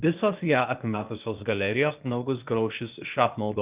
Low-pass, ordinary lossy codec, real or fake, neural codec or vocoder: 3.6 kHz; Opus, 24 kbps; fake; codec, 16 kHz, 0.8 kbps, ZipCodec